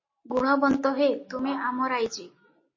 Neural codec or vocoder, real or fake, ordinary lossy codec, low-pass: none; real; MP3, 32 kbps; 7.2 kHz